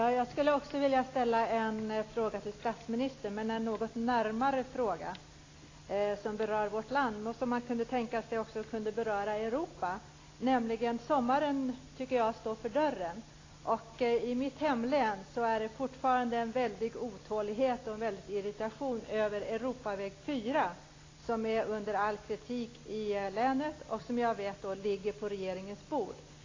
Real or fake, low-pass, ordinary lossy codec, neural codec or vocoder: real; 7.2 kHz; AAC, 32 kbps; none